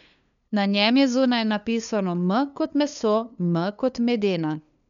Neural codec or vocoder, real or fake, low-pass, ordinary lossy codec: codec, 16 kHz, 2 kbps, FunCodec, trained on LibriTTS, 25 frames a second; fake; 7.2 kHz; none